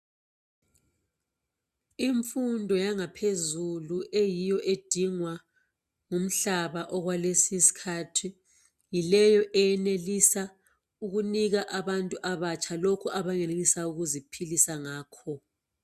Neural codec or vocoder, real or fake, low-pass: none; real; 14.4 kHz